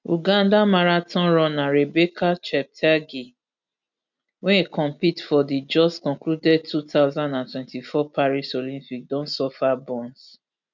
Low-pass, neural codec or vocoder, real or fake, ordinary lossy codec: 7.2 kHz; none; real; none